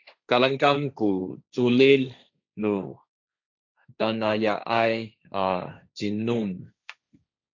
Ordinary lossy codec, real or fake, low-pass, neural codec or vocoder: AAC, 48 kbps; fake; 7.2 kHz; codec, 16 kHz, 1.1 kbps, Voila-Tokenizer